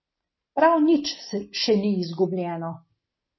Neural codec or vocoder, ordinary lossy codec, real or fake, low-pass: none; MP3, 24 kbps; real; 7.2 kHz